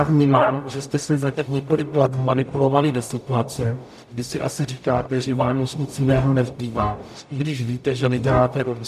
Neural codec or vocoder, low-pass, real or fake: codec, 44.1 kHz, 0.9 kbps, DAC; 14.4 kHz; fake